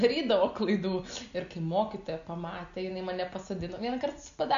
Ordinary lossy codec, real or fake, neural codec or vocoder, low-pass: MP3, 96 kbps; real; none; 7.2 kHz